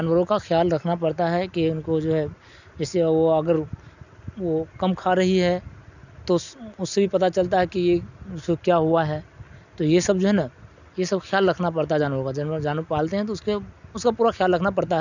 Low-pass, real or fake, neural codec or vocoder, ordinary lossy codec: 7.2 kHz; real; none; none